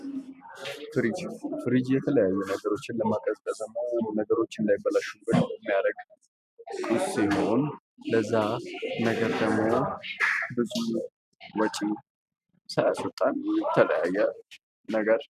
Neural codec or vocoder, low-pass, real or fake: none; 14.4 kHz; real